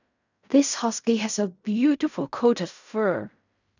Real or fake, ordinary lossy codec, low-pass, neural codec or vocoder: fake; none; 7.2 kHz; codec, 16 kHz in and 24 kHz out, 0.4 kbps, LongCat-Audio-Codec, fine tuned four codebook decoder